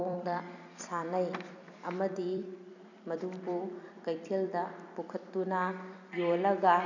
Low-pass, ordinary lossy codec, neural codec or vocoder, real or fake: 7.2 kHz; none; vocoder, 44.1 kHz, 128 mel bands every 256 samples, BigVGAN v2; fake